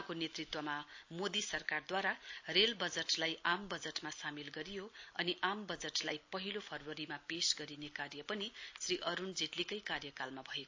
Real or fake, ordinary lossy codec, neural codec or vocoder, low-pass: real; AAC, 48 kbps; none; 7.2 kHz